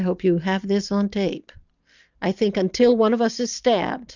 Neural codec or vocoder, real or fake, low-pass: none; real; 7.2 kHz